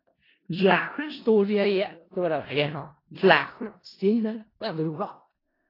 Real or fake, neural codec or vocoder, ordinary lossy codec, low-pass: fake; codec, 16 kHz in and 24 kHz out, 0.4 kbps, LongCat-Audio-Codec, four codebook decoder; AAC, 24 kbps; 5.4 kHz